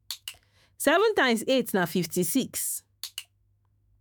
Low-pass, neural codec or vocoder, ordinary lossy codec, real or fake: none; autoencoder, 48 kHz, 128 numbers a frame, DAC-VAE, trained on Japanese speech; none; fake